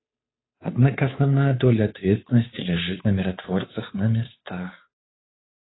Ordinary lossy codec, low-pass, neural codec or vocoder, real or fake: AAC, 16 kbps; 7.2 kHz; codec, 16 kHz, 2 kbps, FunCodec, trained on Chinese and English, 25 frames a second; fake